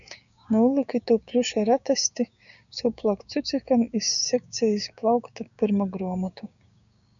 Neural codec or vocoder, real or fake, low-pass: codec, 16 kHz, 6 kbps, DAC; fake; 7.2 kHz